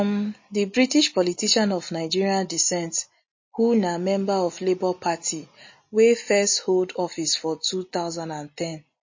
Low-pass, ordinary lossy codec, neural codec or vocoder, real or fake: 7.2 kHz; MP3, 32 kbps; none; real